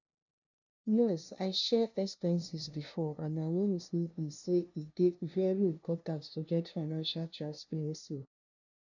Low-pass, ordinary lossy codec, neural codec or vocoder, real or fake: 7.2 kHz; none; codec, 16 kHz, 0.5 kbps, FunCodec, trained on LibriTTS, 25 frames a second; fake